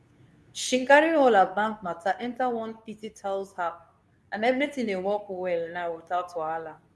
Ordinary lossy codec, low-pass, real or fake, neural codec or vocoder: none; none; fake; codec, 24 kHz, 0.9 kbps, WavTokenizer, medium speech release version 2